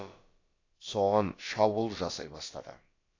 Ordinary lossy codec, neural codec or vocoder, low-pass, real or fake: AAC, 32 kbps; codec, 16 kHz, about 1 kbps, DyCAST, with the encoder's durations; 7.2 kHz; fake